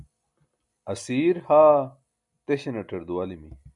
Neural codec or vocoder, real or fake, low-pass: none; real; 10.8 kHz